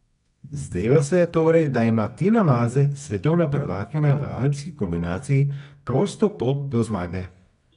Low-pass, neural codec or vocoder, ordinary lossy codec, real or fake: 10.8 kHz; codec, 24 kHz, 0.9 kbps, WavTokenizer, medium music audio release; none; fake